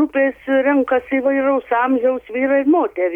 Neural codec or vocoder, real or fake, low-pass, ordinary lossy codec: none; real; 19.8 kHz; MP3, 96 kbps